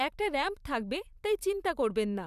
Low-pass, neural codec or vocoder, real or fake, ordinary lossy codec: 14.4 kHz; vocoder, 44.1 kHz, 128 mel bands every 512 samples, BigVGAN v2; fake; none